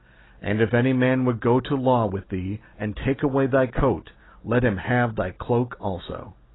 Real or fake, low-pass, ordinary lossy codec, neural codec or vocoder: real; 7.2 kHz; AAC, 16 kbps; none